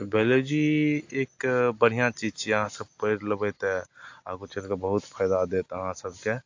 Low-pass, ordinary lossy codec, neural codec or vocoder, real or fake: 7.2 kHz; AAC, 48 kbps; none; real